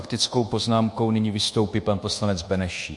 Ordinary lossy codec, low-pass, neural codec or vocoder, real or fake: MP3, 48 kbps; 10.8 kHz; codec, 24 kHz, 1.2 kbps, DualCodec; fake